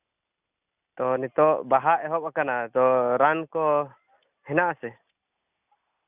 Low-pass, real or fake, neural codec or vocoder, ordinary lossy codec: 3.6 kHz; real; none; none